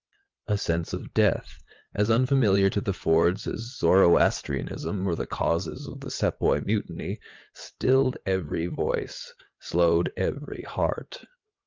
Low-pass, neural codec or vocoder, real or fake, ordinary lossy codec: 7.2 kHz; vocoder, 22.05 kHz, 80 mel bands, WaveNeXt; fake; Opus, 24 kbps